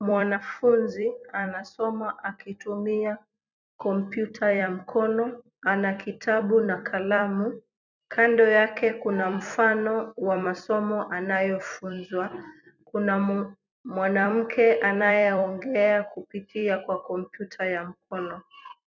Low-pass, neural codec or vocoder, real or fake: 7.2 kHz; vocoder, 44.1 kHz, 128 mel bands every 256 samples, BigVGAN v2; fake